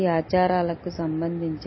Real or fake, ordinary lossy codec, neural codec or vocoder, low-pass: real; MP3, 24 kbps; none; 7.2 kHz